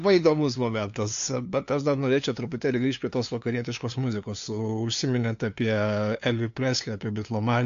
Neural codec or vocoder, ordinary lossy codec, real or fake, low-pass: codec, 16 kHz, 2 kbps, FunCodec, trained on LibriTTS, 25 frames a second; AAC, 64 kbps; fake; 7.2 kHz